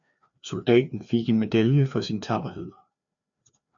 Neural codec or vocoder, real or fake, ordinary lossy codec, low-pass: codec, 16 kHz, 2 kbps, FreqCodec, larger model; fake; MP3, 96 kbps; 7.2 kHz